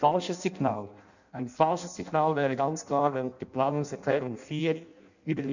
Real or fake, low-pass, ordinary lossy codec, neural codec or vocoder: fake; 7.2 kHz; none; codec, 16 kHz in and 24 kHz out, 0.6 kbps, FireRedTTS-2 codec